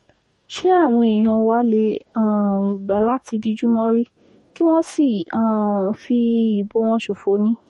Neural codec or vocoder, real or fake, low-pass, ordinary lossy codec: codec, 44.1 kHz, 2.6 kbps, DAC; fake; 19.8 kHz; MP3, 48 kbps